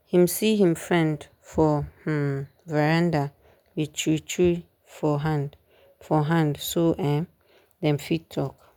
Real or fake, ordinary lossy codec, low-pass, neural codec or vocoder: real; none; 19.8 kHz; none